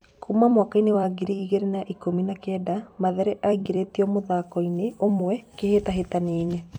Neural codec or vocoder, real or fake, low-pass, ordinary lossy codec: vocoder, 44.1 kHz, 128 mel bands every 256 samples, BigVGAN v2; fake; 19.8 kHz; none